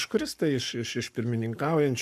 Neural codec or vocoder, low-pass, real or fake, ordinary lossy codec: codec, 44.1 kHz, 7.8 kbps, Pupu-Codec; 14.4 kHz; fake; MP3, 96 kbps